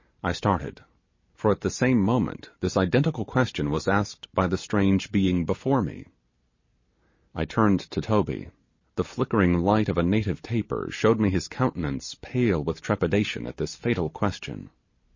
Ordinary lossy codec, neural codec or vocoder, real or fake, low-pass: MP3, 32 kbps; none; real; 7.2 kHz